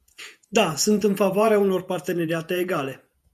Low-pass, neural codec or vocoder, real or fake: 14.4 kHz; vocoder, 48 kHz, 128 mel bands, Vocos; fake